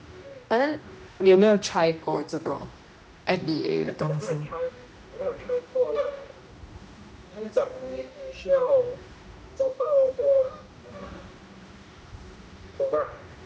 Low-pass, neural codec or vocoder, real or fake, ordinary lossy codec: none; codec, 16 kHz, 1 kbps, X-Codec, HuBERT features, trained on general audio; fake; none